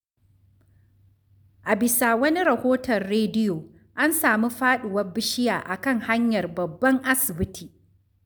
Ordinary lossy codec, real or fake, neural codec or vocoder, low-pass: none; real; none; none